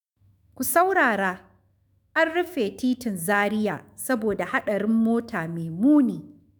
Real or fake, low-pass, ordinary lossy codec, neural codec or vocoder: fake; none; none; autoencoder, 48 kHz, 128 numbers a frame, DAC-VAE, trained on Japanese speech